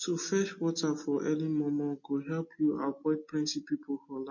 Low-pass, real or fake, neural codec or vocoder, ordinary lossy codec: 7.2 kHz; real; none; MP3, 32 kbps